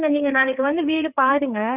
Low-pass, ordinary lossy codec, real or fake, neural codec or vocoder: 3.6 kHz; none; fake; codec, 16 kHz, 4 kbps, FreqCodec, larger model